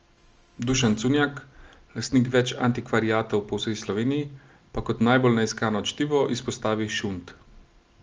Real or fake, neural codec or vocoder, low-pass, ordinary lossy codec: real; none; 7.2 kHz; Opus, 24 kbps